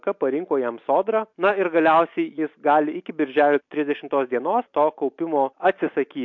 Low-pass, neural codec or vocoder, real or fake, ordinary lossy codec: 7.2 kHz; none; real; MP3, 48 kbps